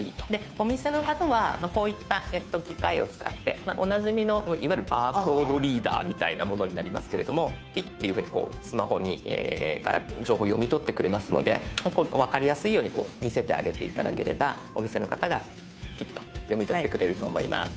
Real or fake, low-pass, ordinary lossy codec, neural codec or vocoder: fake; none; none; codec, 16 kHz, 2 kbps, FunCodec, trained on Chinese and English, 25 frames a second